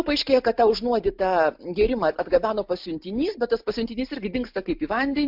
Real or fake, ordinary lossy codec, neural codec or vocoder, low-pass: real; MP3, 48 kbps; none; 5.4 kHz